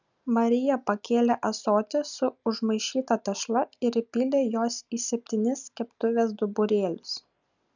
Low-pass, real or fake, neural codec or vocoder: 7.2 kHz; real; none